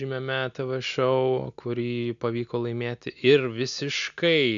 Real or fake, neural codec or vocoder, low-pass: real; none; 7.2 kHz